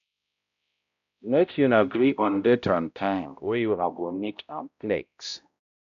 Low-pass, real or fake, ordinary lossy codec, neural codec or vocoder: 7.2 kHz; fake; none; codec, 16 kHz, 0.5 kbps, X-Codec, HuBERT features, trained on balanced general audio